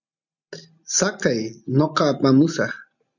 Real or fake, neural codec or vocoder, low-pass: real; none; 7.2 kHz